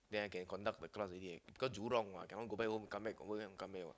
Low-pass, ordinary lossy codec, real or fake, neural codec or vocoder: none; none; real; none